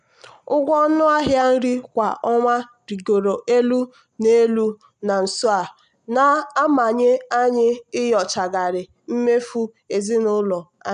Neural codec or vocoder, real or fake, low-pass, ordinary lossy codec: none; real; 9.9 kHz; none